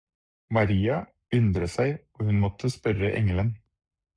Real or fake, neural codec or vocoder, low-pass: fake; codec, 44.1 kHz, 7.8 kbps, Pupu-Codec; 9.9 kHz